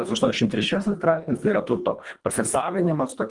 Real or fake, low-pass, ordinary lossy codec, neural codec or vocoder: fake; 10.8 kHz; Opus, 24 kbps; codec, 24 kHz, 1.5 kbps, HILCodec